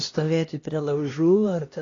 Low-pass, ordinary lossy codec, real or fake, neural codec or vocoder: 7.2 kHz; AAC, 32 kbps; fake; codec, 16 kHz, 1 kbps, X-Codec, HuBERT features, trained on LibriSpeech